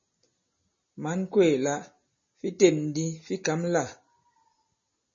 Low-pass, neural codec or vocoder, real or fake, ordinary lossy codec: 7.2 kHz; none; real; MP3, 32 kbps